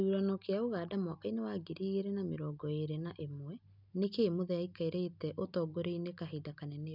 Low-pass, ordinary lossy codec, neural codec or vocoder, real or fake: 5.4 kHz; none; none; real